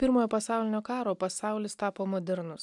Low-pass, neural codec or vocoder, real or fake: 10.8 kHz; none; real